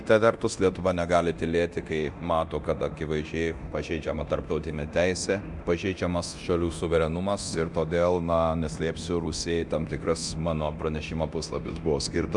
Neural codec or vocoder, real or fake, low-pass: codec, 24 kHz, 0.9 kbps, DualCodec; fake; 10.8 kHz